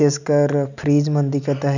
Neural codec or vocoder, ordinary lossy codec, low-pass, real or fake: none; none; 7.2 kHz; real